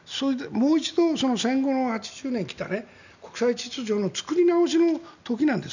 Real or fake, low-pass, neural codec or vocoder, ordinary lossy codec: real; 7.2 kHz; none; none